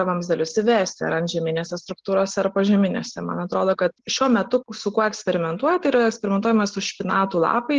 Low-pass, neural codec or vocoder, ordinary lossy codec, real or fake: 7.2 kHz; none; Opus, 16 kbps; real